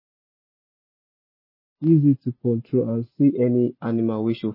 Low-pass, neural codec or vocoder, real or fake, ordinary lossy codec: 5.4 kHz; none; real; MP3, 24 kbps